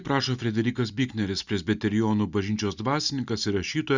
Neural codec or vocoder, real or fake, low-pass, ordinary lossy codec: none; real; 7.2 kHz; Opus, 64 kbps